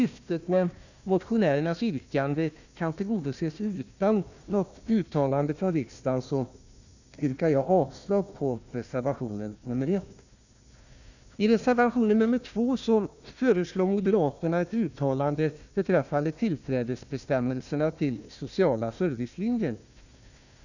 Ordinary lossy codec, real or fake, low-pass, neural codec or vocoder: none; fake; 7.2 kHz; codec, 16 kHz, 1 kbps, FunCodec, trained on Chinese and English, 50 frames a second